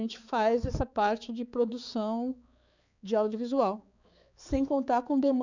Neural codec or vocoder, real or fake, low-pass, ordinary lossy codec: codec, 16 kHz, 4 kbps, X-Codec, HuBERT features, trained on balanced general audio; fake; 7.2 kHz; none